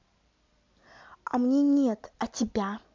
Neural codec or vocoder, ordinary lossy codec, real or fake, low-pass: none; MP3, 64 kbps; real; 7.2 kHz